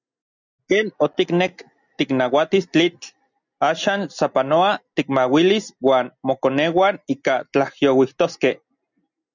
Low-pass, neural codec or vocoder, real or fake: 7.2 kHz; none; real